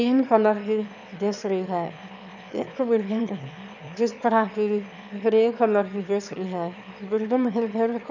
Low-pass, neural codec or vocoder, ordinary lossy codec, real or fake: 7.2 kHz; autoencoder, 22.05 kHz, a latent of 192 numbers a frame, VITS, trained on one speaker; none; fake